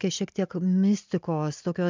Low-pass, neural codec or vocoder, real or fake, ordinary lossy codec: 7.2 kHz; none; real; MP3, 64 kbps